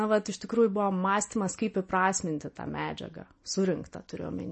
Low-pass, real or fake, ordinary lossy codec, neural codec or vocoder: 10.8 kHz; real; MP3, 32 kbps; none